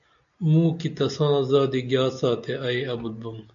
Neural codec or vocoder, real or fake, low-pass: none; real; 7.2 kHz